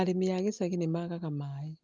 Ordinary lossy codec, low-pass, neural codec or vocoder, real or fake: Opus, 32 kbps; 7.2 kHz; none; real